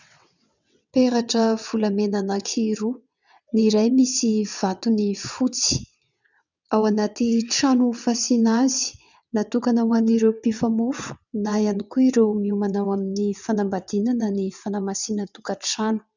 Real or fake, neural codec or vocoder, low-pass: fake; vocoder, 22.05 kHz, 80 mel bands, WaveNeXt; 7.2 kHz